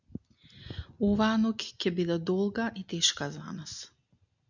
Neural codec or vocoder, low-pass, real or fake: none; 7.2 kHz; real